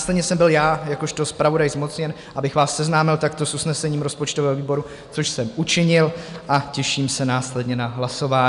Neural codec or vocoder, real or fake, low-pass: none; real; 10.8 kHz